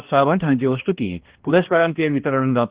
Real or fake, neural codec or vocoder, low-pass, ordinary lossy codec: fake; codec, 16 kHz, 1 kbps, X-Codec, HuBERT features, trained on balanced general audio; 3.6 kHz; Opus, 16 kbps